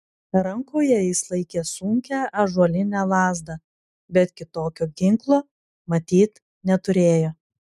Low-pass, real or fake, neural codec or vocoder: 14.4 kHz; real; none